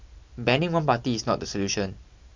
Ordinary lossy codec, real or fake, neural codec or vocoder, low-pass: MP3, 64 kbps; real; none; 7.2 kHz